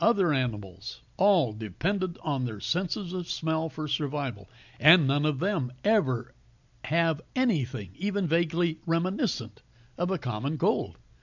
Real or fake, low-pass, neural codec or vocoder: real; 7.2 kHz; none